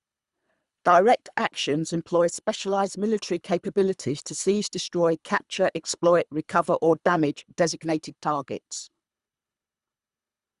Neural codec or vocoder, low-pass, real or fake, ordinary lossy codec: codec, 24 kHz, 3 kbps, HILCodec; 10.8 kHz; fake; Opus, 64 kbps